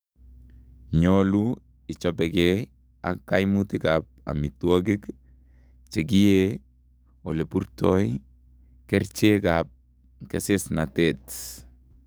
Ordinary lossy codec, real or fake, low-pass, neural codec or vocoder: none; fake; none; codec, 44.1 kHz, 7.8 kbps, DAC